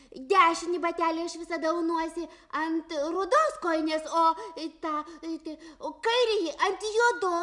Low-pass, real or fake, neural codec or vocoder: 10.8 kHz; real; none